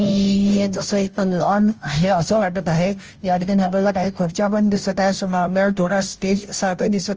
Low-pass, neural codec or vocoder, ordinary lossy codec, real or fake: 7.2 kHz; codec, 16 kHz, 0.5 kbps, FunCodec, trained on Chinese and English, 25 frames a second; Opus, 24 kbps; fake